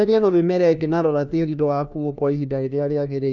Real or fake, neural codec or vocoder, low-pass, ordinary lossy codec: fake; codec, 16 kHz, 1 kbps, FunCodec, trained on LibriTTS, 50 frames a second; 7.2 kHz; none